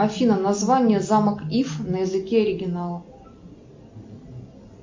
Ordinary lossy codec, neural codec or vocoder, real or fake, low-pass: MP3, 48 kbps; none; real; 7.2 kHz